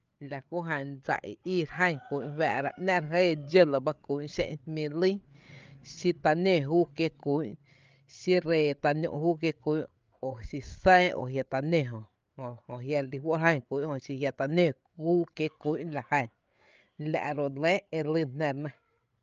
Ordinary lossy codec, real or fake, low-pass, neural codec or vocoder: Opus, 24 kbps; fake; 7.2 kHz; codec, 16 kHz, 8 kbps, FreqCodec, larger model